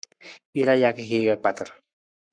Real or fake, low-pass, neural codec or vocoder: fake; 9.9 kHz; codec, 44.1 kHz, 7.8 kbps, Pupu-Codec